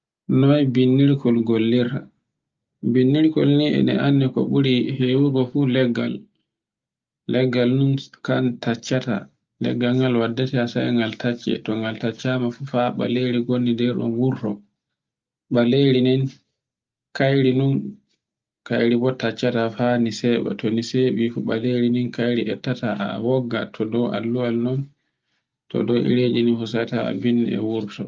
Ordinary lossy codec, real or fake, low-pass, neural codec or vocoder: Opus, 24 kbps; real; 7.2 kHz; none